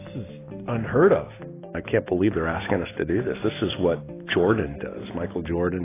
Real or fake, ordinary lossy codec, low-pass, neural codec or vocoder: real; AAC, 16 kbps; 3.6 kHz; none